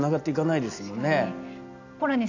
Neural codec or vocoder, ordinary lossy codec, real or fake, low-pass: none; none; real; 7.2 kHz